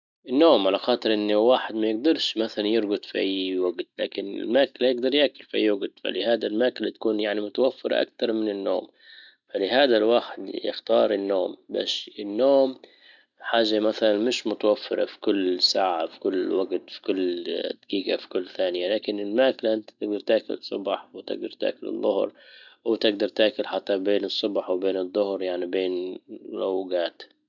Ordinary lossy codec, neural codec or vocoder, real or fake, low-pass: none; none; real; 7.2 kHz